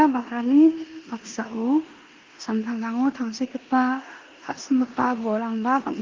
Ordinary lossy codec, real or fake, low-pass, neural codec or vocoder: Opus, 32 kbps; fake; 7.2 kHz; codec, 16 kHz in and 24 kHz out, 0.9 kbps, LongCat-Audio-Codec, four codebook decoder